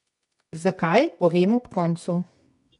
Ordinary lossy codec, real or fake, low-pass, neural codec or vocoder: none; fake; 10.8 kHz; codec, 24 kHz, 0.9 kbps, WavTokenizer, medium music audio release